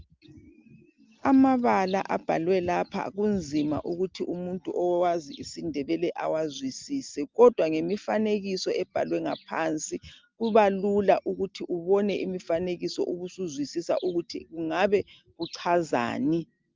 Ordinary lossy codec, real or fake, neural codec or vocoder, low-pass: Opus, 32 kbps; real; none; 7.2 kHz